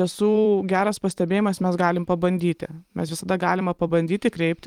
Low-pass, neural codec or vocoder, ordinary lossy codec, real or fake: 19.8 kHz; vocoder, 44.1 kHz, 128 mel bands every 256 samples, BigVGAN v2; Opus, 24 kbps; fake